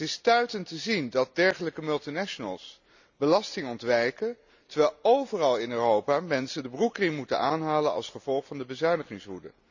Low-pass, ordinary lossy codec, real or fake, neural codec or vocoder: 7.2 kHz; none; real; none